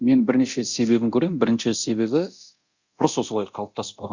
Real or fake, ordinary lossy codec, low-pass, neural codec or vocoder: fake; Opus, 64 kbps; 7.2 kHz; codec, 24 kHz, 0.9 kbps, DualCodec